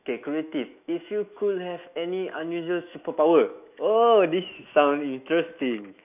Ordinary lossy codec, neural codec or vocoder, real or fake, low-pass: none; autoencoder, 48 kHz, 128 numbers a frame, DAC-VAE, trained on Japanese speech; fake; 3.6 kHz